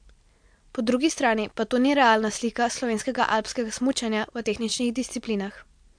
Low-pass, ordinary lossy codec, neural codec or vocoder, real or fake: 9.9 kHz; MP3, 64 kbps; none; real